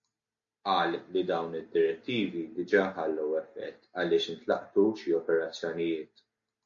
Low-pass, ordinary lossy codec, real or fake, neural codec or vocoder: 7.2 kHz; MP3, 64 kbps; real; none